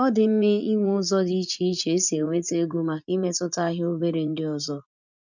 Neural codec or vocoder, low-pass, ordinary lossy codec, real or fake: vocoder, 44.1 kHz, 80 mel bands, Vocos; 7.2 kHz; none; fake